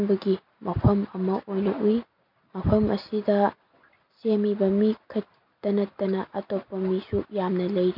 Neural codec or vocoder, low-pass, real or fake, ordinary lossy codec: none; 5.4 kHz; real; MP3, 48 kbps